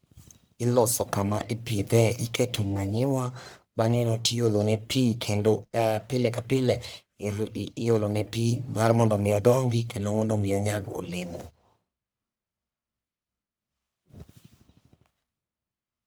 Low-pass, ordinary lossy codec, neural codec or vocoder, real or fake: none; none; codec, 44.1 kHz, 1.7 kbps, Pupu-Codec; fake